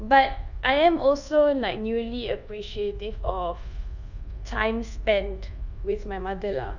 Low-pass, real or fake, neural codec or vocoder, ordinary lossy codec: 7.2 kHz; fake; codec, 24 kHz, 1.2 kbps, DualCodec; none